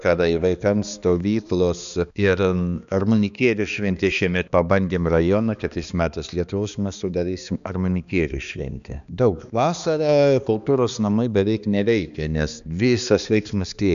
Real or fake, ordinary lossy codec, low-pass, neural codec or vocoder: fake; MP3, 96 kbps; 7.2 kHz; codec, 16 kHz, 2 kbps, X-Codec, HuBERT features, trained on balanced general audio